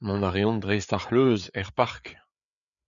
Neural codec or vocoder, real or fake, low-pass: codec, 16 kHz, 4 kbps, FreqCodec, larger model; fake; 7.2 kHz